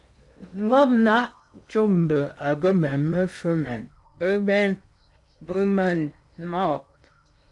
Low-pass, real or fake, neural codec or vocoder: 10.8 kHz; fake; codec, 16 kHz in and 24 kHz out, 0.8 kbps, FocalCodec, streaming, 65536 codes